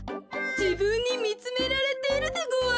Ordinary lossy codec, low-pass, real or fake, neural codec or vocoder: none; none; real; none